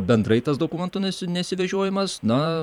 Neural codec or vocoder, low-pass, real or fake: vocoder, 44.1 kHz, 128 mel bands every 512 samples, BigVGAN v2; 19.8 kHz; fake